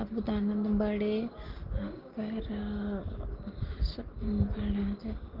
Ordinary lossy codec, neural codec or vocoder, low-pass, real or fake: Opus, 16 kbps; none; 5.4 kHz; real